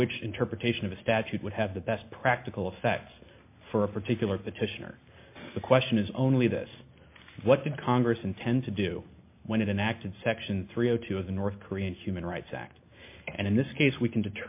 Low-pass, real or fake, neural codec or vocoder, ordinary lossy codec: 3.6 kHz; real; none; MP3, 24 kbps